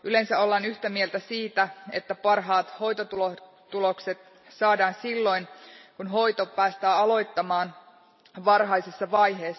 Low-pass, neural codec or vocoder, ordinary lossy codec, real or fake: 7.2 kHz; none; MP3, 24 kbps; real